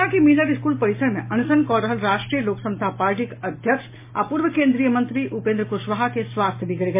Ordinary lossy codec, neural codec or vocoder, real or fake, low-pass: MP3, 24 kbps; none; real; 3.6 kHz